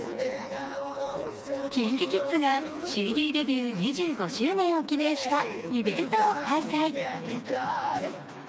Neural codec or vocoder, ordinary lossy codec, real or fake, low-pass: codec, 16 kHz, 2 kbps, FreqCodec, smaller model; none; fake; none